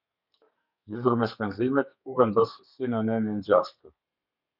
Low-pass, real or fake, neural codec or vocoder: 5.4 kHz; fake; codec, 32 kHz, 1.9 kbps, SNAC